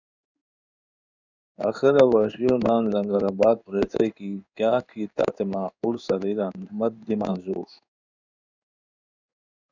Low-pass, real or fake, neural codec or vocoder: 7.2 kHz; fake; codec, 16 kHz in and 24 kHz out, 1 kbps, XY-Tokenizer